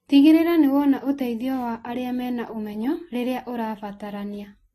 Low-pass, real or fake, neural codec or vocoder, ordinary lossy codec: 19.8 kHz; real; none; AAC, 32 kbps